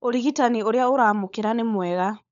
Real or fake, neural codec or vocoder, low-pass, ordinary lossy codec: fake; codec, 16 kHz, 16 kbps, FunCodec, trained on LibriTTS, 50 frames a second; 7.2 kHz; none